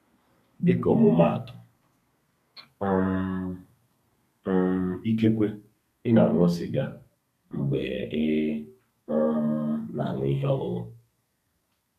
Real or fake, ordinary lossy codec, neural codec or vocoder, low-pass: fake; none; codec, 32 kHz, 1.9 kbps, SNAC; 14.4 kHz